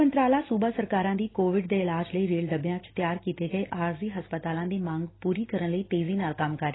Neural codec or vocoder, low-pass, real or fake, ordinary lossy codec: none; 7.2 kHz; real; AAC, 16 kbps